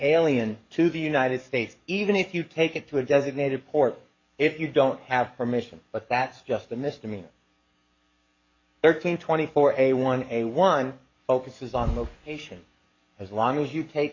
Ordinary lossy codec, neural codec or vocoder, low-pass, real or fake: MP3, 48 kbps; codec, 44.1 kHz, 7.8 kbps, DAC; 7.2 kHz; fake